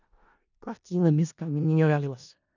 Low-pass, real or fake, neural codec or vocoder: 7.2 kHz; fake; codec, 16 kHz in and 24 kHz out, 0.4 kbps, LongCat-Audio-Codec, four codebook decoder